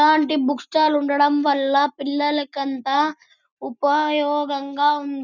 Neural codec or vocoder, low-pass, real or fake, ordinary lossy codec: none; 7.2 kHz; real; none